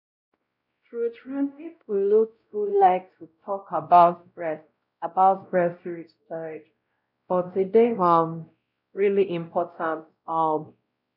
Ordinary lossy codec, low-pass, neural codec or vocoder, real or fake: none; 5.4 kHz; codec, 16 kHz, 0.5 kbps, X-Codec, WavLM features, trained on Multilingual LibriSpeech; fake